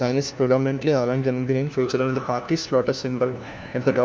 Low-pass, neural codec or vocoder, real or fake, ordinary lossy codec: none; codec, 16 kHz, 1 kbps, FunCodec, trained on LibriTTS, 50 frames a second; fake; none